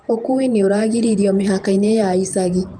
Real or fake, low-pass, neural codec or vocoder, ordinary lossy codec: fake; 9.9 kHz; vocoder, 48 kHz, 128 mel bands, Vocos; Opus, 32 kbps